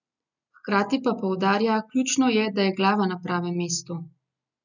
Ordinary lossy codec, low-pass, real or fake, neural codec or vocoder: none; 7.2 kHz; real; none